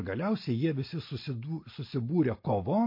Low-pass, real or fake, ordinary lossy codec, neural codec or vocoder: 5.4 kHz; real; MP3, 32 kbps; none